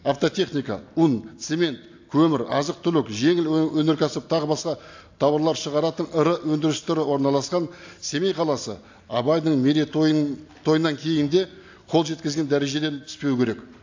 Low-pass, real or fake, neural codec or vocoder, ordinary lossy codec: 7.2 kHz; real; none; AAC, 48 kbps